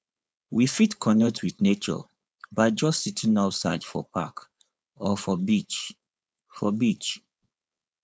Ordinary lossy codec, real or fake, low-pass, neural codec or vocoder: none; fake; none; codec, 16 kHz, 4.8 kbps, FACodec